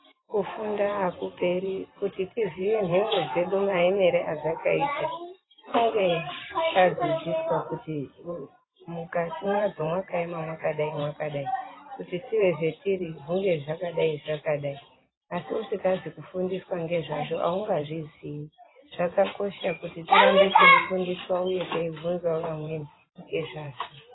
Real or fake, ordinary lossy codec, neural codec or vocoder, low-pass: fake; AAC, 16 kbps; vocoder, 44.1 kHz, 128 mel bands every 512 samples, BigVGAN v2; 7.2 kHz